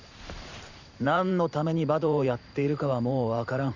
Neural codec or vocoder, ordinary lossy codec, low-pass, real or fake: vocoder, 44.1 kHz, 128 mel bands every 512 samples, BigVGAN v2; none; 7.2 kHz; fake